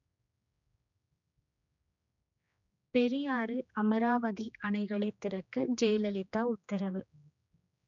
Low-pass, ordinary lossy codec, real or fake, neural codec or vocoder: 7.2 kHz; none; fake; codec, 16 kHz, 2 kbps, X-Codec, HuBERT features, trained on general audio